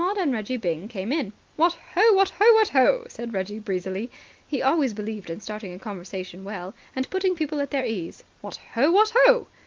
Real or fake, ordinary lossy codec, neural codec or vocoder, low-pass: real; Opus, 32 kbps; none; 7.2 kHz